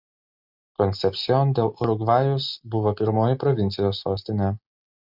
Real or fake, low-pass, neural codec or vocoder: real; 5.4 kHz; none